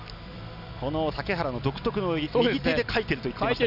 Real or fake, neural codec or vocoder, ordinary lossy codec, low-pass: real; none; none; 5.4 kHz